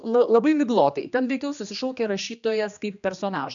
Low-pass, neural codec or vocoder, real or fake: 7.2 kHz; codec, 16 kHz, 2 kbps, X-Codec, HuBERT features, trained on general audio; fake